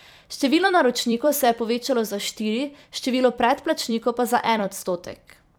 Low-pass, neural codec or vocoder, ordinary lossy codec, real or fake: none; vocoder, 44.1 kHz, 128 mel bands every 256 samples, BigVGAN v2; none; fake